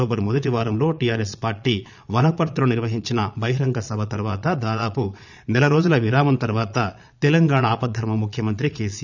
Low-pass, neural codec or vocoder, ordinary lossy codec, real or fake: 7.2 kHz; vocoder, 22.05 kHz, 80 mel bands, Vocos; none; fake